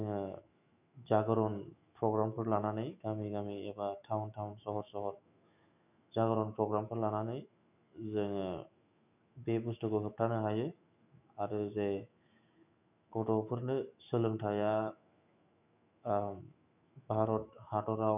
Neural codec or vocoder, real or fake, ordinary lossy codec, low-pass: autoencoder, 48 kHz, 128 numbers a frame, DAC-VAE, trained on Japanese speech; fake; none; 3.6 kHz